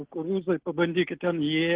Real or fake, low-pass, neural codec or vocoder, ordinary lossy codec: real; 3.6 kHz; none; Opus, 16 kbps